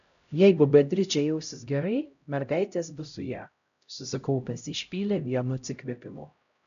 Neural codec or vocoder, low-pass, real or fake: codec, 16 kHz, 0.5 kbps, X-Codec, HuBERT features, trained on LibriSpeech; 7.2 kHz; fake